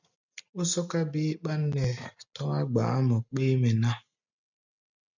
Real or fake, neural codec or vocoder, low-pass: real; none; 7.2 kHz